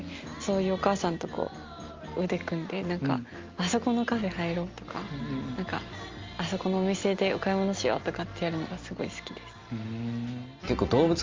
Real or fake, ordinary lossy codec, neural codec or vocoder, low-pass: real; Opus, 32 kbps; none; 7.2 kHz